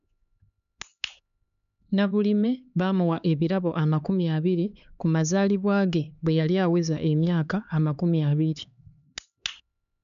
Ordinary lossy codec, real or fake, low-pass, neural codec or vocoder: none; fake; 7.2 kHz; codec, 16 kHz, 4 kbps, X-Codec, HuBERT features, trained on LibriSpeech